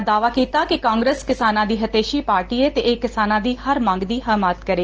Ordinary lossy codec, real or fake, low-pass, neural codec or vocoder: Opus, 16 kbps; real; 7.2 kHz; none